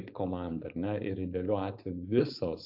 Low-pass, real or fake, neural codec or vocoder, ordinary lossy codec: 5.4 kHz; fake; codec, 16 kHz, 4.8 kbps, FACodec; AAC, 48 kbps